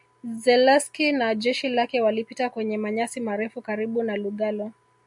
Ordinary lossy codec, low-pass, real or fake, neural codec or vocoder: MP3, 96 kbps; 10.8 kHz; real; none